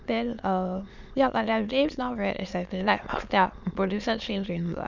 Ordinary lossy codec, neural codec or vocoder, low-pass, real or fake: none; autoencoder, 22.05 kHz, a latent of 192 numbers a frame, VITS, trained on many speakers; 7.2 kHz; fake